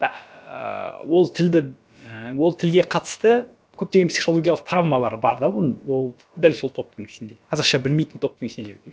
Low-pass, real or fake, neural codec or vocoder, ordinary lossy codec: none; fake; codec, 16 kHz, about 1 kbps, DyCAST, with the encoder's durations; none